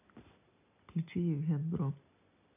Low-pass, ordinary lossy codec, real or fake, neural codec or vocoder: 3.6 kHz; none; real; none